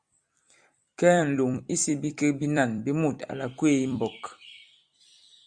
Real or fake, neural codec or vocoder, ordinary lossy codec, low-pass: fake; vocoder, 44.1 kHz, 128 mel bands every 256 samples, BigVGAN v2; Opus, 64 kbps; 9.9 kHz